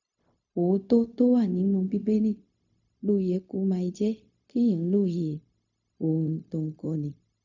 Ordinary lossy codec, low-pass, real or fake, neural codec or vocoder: none; 7.2 kHz; fake; codec, 16 kHz, 0.4 kbps, LongCat-Audio-Codec